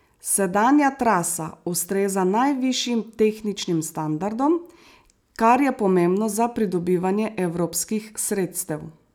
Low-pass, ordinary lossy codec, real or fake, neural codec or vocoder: none; none; real; none